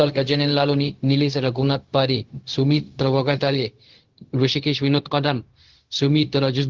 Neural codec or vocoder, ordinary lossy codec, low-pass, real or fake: codec, 16 kHz, 0.4 kbps, LongCat-Audio-Codec; Opus, 16 kbps; 7.2 kHz; fake